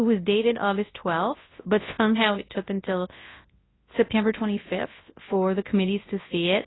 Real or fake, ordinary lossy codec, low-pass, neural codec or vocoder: fake; AAC, 16 kbps; 7.2 kHz; codec, 24 kHz, 0.9 kbps, WavTokenizer, large speech release